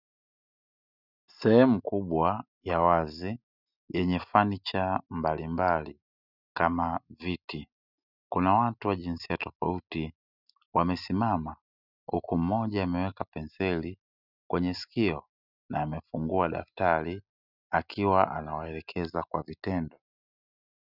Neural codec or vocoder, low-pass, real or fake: none; 5.4 kHz; real